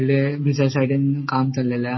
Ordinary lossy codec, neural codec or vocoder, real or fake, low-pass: MP3, 24 kbps; none; real; 7.2 kHz